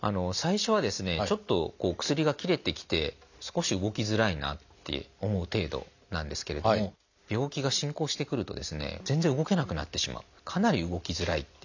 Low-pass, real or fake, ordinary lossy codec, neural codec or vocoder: 7.2 kHz; real; none; none